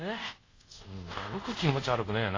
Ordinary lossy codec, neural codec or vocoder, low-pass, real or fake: AAC, 32 kbps; codec, 24 kHz, 0.5 kbps, DualCodec; 7.2 kHz; fake